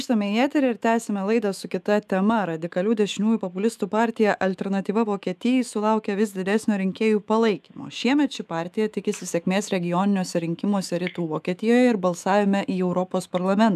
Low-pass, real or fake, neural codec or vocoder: 14.4 kHz; fake; autoencoder, 48 kHz, 128 numbers a frame, DAC-VAE, trained on Japanese speech